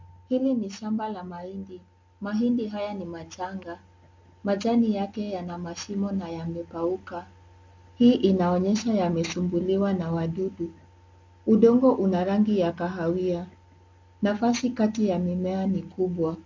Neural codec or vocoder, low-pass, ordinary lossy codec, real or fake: none; 7.2 kHz; MP3, 48 kbps; real